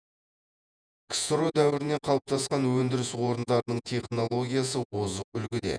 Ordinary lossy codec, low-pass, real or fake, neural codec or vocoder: none; 9.9 kHz; fake; vocoder, 48 kHz, 128 mel bands, Vocos